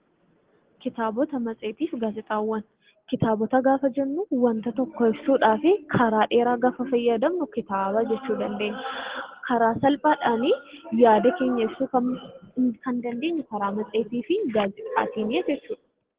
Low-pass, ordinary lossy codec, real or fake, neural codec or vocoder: 3.6 kHz; Opus, 16 kbps; real; none